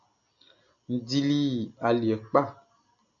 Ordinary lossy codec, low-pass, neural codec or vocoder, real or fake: AAC, 64 kbps; 7.2 kHz; none; real